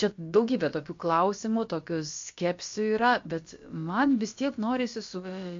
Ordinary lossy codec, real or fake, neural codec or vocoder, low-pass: MP3, 48 kbps; fake; codec, 16 kHz, about 1 kbps, DyCAST, with the encoder's durations; 7.2 kHz